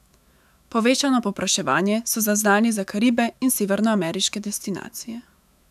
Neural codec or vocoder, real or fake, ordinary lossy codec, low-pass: autoencoder, 48 kHz, 128 numbers a frame, DAC-VAE, trained on Japanese speech; fake; none; 14.4 kHz